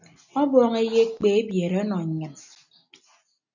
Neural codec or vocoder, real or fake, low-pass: none; real; 7.2 kHz